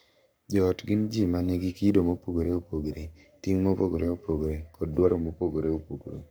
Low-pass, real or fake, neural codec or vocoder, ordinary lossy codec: none; fake; codec, 44.1 kHz, 7.8 kbps, Pupu-Codec; none